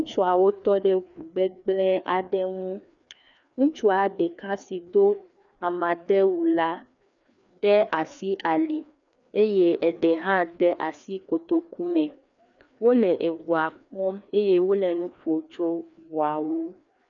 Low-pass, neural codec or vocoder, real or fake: 7.2 kHz; codec, 16 kHz, 2 kbps, FreqCodec, larger model; fake